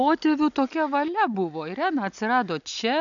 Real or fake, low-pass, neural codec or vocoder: real; 7.2 kHz; none